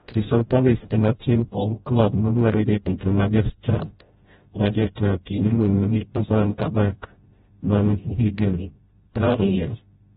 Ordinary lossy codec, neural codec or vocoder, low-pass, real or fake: AAC, 16 kbps; codec, 16 kHz, 0.5 kbps, FreqCodec, smaller model; 7.2 kHz; fake